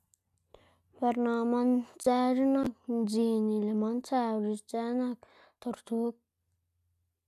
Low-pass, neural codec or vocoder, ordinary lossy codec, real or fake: 14.4 kHz; none; none; real